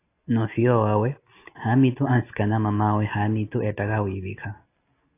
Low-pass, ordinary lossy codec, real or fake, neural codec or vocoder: 3.6 kHz; AAC, 24 kbps; real; none